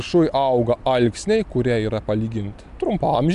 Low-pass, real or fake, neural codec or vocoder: 10.8 kHz; real; none